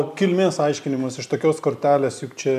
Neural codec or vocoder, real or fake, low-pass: none; real; 14.4 kHz